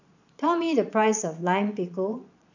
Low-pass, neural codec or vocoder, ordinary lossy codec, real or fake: 7.2 kHz; vocoder, 22.05 kHz, 80 mel bands, Vocos; none; fake